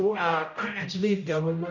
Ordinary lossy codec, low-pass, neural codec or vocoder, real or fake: none; 7.2 kHz; codec, 16 kHz, 0.5 kbps, X-Codec, HuBERT features, trained on general audio; fake